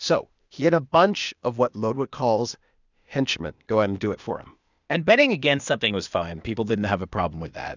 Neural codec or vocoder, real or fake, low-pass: codec, 16 kHz, 0.8 kbps, ZipCodec; fake; 7.2 kHz